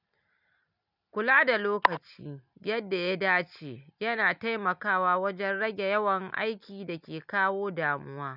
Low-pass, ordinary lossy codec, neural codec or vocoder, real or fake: 5.4 kHz; none; none; real